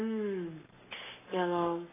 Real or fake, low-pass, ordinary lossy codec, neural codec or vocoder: fake; 3.6 kHz; AAC, 16 kbps; codec, 44.1 kHz, 7.8 kbps, Pupu-Codec